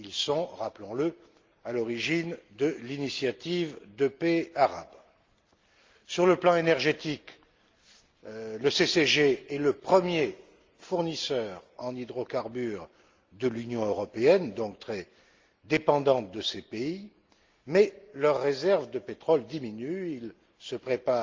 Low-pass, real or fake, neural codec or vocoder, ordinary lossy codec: 7.2 kHz; real; none; Opus, 32 kbps